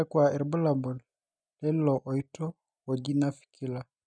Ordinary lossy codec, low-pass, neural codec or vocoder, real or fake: none; 9.9 kHz; none; real